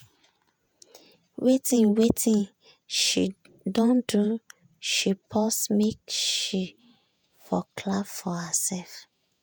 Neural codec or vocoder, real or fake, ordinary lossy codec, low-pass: vocoder, 48 kHz, 128 mel bands, Vocos; fake; none; none